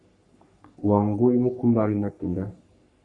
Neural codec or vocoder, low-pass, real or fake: codec, 44.1 kHz, 3.4 kbps, Pupu-Codec; 10.8 kHz; fake